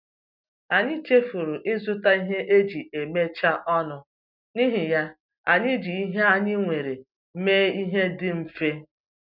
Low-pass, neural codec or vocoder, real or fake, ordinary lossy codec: 5.4 kHz; none; real; none